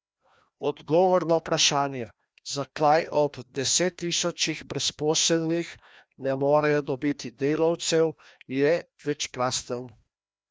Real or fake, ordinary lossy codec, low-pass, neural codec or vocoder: fake; none; none; codec, 16 kHz, 1 kbps, FreqCodec, larger model